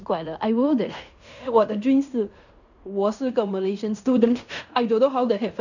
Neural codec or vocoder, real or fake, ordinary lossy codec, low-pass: codec, 16 kHz in and 24 kHz out, 0.9 kbps, LongCat-Audio-Codec, fine tuned four codebook decoder; fake; none; 7.2 kHz